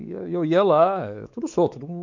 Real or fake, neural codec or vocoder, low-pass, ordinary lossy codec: fake; codec, 16 kHz, 4 kbps, X-Codec, WavLM features, trained on Multilingual LibriSpeech; 7.2 kHz; none